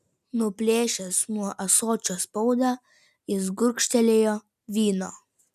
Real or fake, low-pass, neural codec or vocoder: real; 14.4 kHz; none